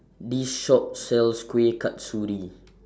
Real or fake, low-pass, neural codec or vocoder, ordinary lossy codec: real; none; none; none